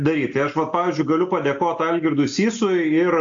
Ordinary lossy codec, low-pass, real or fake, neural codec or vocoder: AAC, 64 kbps; 7.2 kHz; real; none